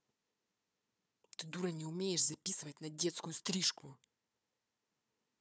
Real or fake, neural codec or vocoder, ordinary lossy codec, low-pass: fake; codec, 16 kHz, 4 kbps, FunCodec, trained on Chinese and English, 50 frames a second; none; none